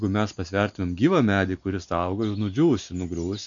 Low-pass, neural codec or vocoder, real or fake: 7.2 kHz; none; real